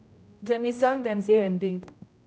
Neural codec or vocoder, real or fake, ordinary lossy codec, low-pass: codec, 16 kHz, 0.5 kbps, X-Codec, HuBERT features, trained on general audio; fake; none; none